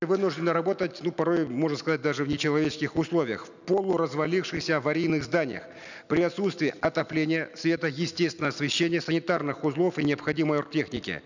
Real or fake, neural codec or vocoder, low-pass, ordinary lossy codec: real; none; 7.2 kHz; none